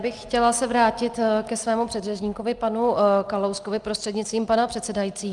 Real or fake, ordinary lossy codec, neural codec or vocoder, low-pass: real; Opus, 32 kbps; none; 10.8 kHz